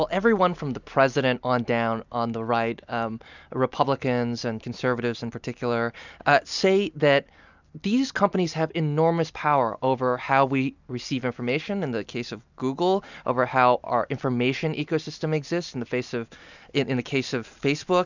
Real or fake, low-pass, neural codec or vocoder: real; 7.2 kHz; none